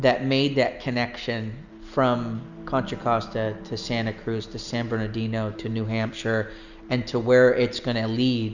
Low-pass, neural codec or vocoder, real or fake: 7.2 kHz; none; real